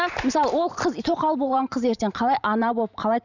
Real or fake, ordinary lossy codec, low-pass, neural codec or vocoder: fake; none; 7.2 kHz; vocoder, 44.1 kHz, 128 mel bands every 512 samples, BigVGAN v2